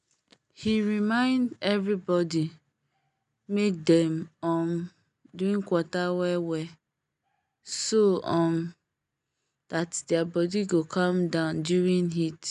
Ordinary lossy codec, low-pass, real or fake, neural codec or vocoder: none; 10.8 kHz; real; none